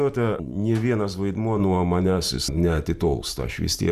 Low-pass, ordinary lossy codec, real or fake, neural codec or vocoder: 14.4 kHz; Opus, 64 kbps; fake; vocoder, 44.1 kHz, 128 mel bands every 512 samples, BigVGAN v2